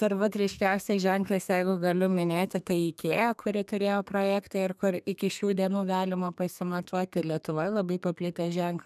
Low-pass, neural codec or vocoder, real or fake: 14.4 kHz; codec, 32 kHz, 1.9 kbps, SNAC; fake